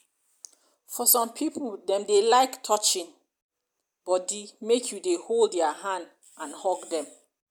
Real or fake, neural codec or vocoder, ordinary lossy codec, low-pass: real; none; none; none